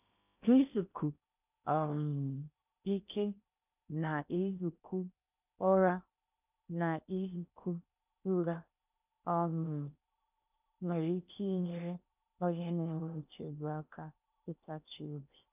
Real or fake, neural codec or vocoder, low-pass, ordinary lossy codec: fake; codec, 16 kHz in and 24 kHz out, 0.8 kbps, FocalCodec, streaming, 65536 codes; 3.6 kHz; none